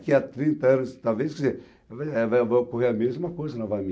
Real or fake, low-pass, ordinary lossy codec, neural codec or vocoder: real; none; none; none